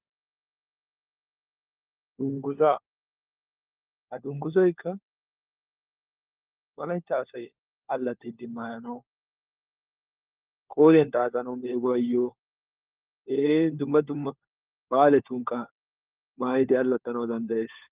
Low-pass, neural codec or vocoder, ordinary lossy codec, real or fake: 3.6 kHz; codec, 16 kHz, 4 kbps, FunCodec, trained on LibriTTS, 50 frames a second; Opus, 32 kbps; fake